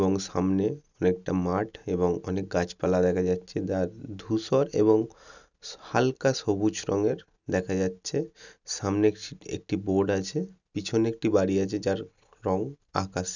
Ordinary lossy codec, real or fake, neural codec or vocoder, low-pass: none; real; none; 7.2 kHz